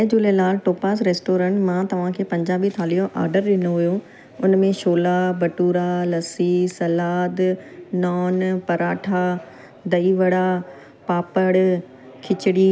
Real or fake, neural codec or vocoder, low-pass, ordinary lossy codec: real; none; none; none